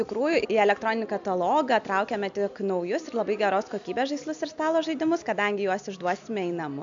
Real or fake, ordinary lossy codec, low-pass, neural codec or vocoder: real; AAC, 64 kbps; 7.2 kHz; none